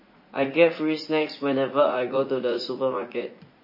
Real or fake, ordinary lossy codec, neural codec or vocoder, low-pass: fake; MP3, 24 kbps; vocoder, 44.1 kHz, 80 mel bands, Vocos; 5.4 kHz